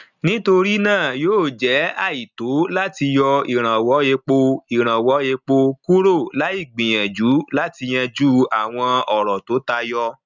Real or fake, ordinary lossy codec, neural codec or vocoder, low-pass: real; none; none; 7.2 kHz